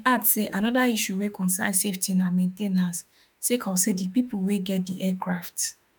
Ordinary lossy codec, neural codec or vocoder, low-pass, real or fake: none; autoencoder, 48 kHz, 32 numbers a frame, DAC-VAE, trained on Japanese speech; none; fake